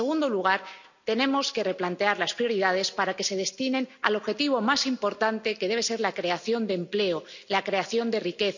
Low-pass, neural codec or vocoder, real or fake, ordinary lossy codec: 7.2 kHz; none; real; none